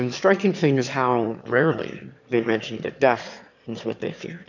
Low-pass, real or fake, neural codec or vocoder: 7.2 kHz; fake; autoencoder, 22.05 kHz, a latent of 192 numbers a frame, VITS, trained on one speaker